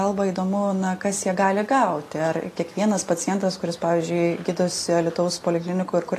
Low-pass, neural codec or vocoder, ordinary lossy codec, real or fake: 14.4 kHz; none; AAC, 48 kbps; real